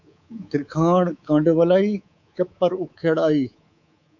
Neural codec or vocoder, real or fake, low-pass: codec, 24 kHz, 3.1 kbps, DualCodec; fake; 7.2 kHz